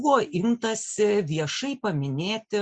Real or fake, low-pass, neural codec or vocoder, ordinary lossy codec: real; 9.9 kHz; none; Opus, 64 kbps